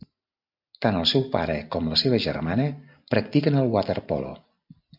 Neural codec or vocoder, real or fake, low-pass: none; real; 5.4 kHz